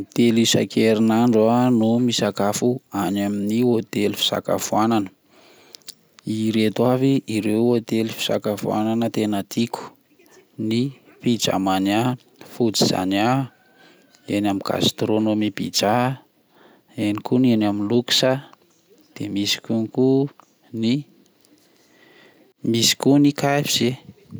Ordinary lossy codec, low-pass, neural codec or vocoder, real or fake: none; none; none; real